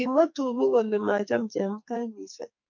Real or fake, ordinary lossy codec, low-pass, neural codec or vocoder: fake; MP3, 48 kbps; 7.2 kHz; codec, 44.1 kHz, 2.6 kbps, SNAC